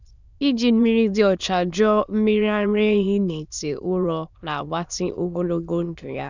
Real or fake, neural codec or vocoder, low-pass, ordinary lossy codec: fake; autoencoder, 22.05 kHz, a latent of 192 numbers a frame, VITS, trained on many speakers; 7.2 kHz; none